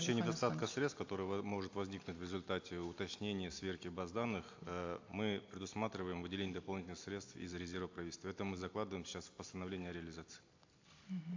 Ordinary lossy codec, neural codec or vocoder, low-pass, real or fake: AAC, 48 kbps; none; 7.2 kHz; real